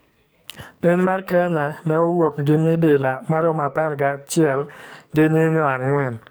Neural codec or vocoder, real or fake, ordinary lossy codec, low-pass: codec, 44.1 kHz, 2.6 kbps, SNAC; fake; none; none